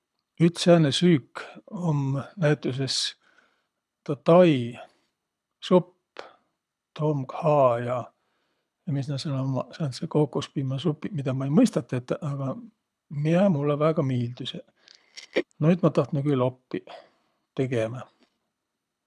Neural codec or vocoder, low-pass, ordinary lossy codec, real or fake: codec, 24 kHz, 6 kbps, HILCodec; none; none; fake